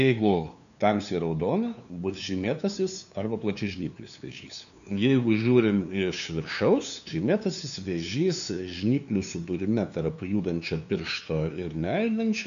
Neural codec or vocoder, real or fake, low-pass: codec, 16 kHz, 2 kbps, FunCodec, trained on LibriTTS, 25 frames a second; fake; 7.2 kHz